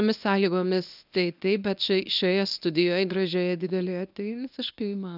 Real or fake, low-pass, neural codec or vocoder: fake; 5.4 kHz; codec, 24 kHz, 0.9 kbps, WavTokenizer, medium speech release version 1